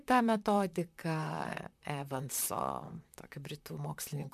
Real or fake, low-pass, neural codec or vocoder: fake; 14.4 kHz; vocoder, 44.1 kHz, 128 mel bands, Pupu-Vocoder